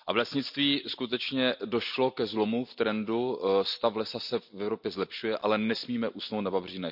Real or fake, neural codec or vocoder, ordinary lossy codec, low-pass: real; none; none; 5.4 kHz